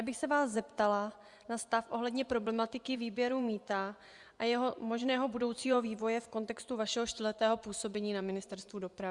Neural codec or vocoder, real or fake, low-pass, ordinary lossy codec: vocoder, 24 kHz, 100 mel bands, Vocos; fake; 10.8 kHz; Opus, 64 kbps